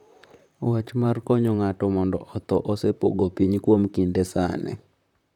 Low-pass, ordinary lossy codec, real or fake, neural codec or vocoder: 19.8 kHz; none; fake; vocoder, 44.1 kHz, 128 mel bands, Pupu-Vocoder